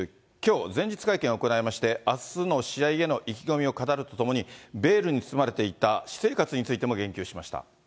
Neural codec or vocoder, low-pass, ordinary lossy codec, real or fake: none; none; none; real